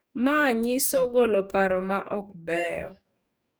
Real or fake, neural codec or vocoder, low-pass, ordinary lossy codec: fake; codec, 44.1 kHz, 2.6 kbps, DAC; none; none